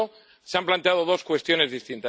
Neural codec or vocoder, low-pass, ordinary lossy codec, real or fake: none; none; none; real